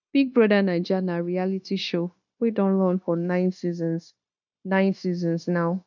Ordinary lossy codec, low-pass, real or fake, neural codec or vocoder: none; 7.2 kHz; fake; codec, 16 kHz, 0.9 kbps, LongCat-Audio-Codec